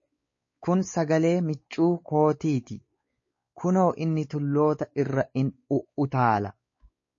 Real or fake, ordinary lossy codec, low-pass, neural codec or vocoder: fake; MP3, 32 kbps; 7.2 kHz; codec, 16 kHz, 4 kbps, X-Codec, WavLM features, trained on Multilingual LibriSpeech